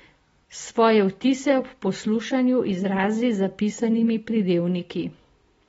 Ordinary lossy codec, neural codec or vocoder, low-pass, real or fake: AAC, 24 kbps; none; 9.9 kHz; real